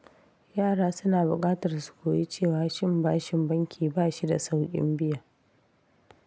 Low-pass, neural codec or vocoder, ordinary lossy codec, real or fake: none; none; none; real